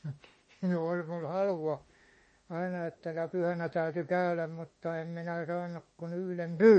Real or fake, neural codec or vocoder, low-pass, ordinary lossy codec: fake; autoencoder, 48 kHz, 32 numbers a frame, DAC-VAE, trained on Japanese speech; 10.8 kHz; MP3, 32 kbps